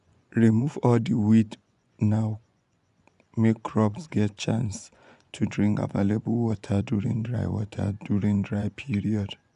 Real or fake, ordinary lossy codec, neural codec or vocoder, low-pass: fake; none; vocoder, 24 kHz, 100 mel bands, Vocos; 10.8 kHz